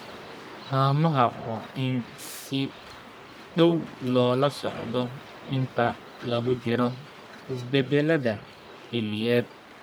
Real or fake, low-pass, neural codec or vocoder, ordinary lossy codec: fake; none; codec, 44.1 kHz, 1.7 kbps, Pupu-Codec; none